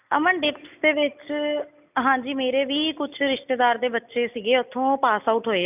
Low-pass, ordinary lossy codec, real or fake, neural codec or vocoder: 3.6 kHz; none; real; none